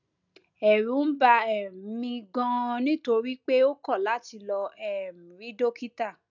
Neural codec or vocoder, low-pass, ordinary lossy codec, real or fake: none; 7.2 kHz; none; real